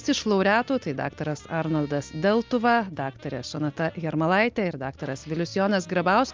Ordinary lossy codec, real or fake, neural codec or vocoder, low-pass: Opus, 32 kbps; real; none; 7.2 kHz